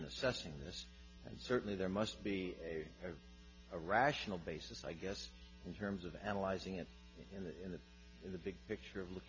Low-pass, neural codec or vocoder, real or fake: 7.2 kHz; none; real